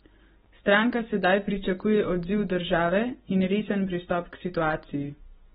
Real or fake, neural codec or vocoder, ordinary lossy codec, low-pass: real; none; AAC, 16 kbps; 10.8 kHz